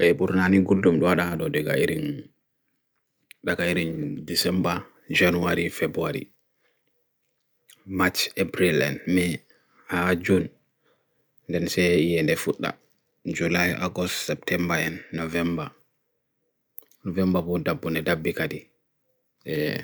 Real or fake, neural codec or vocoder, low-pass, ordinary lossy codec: real; none; none; none